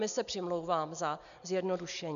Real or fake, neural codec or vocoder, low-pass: real; none; 7.2 kHz